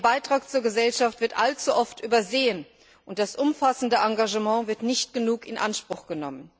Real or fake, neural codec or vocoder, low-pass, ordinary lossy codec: real; none; none; none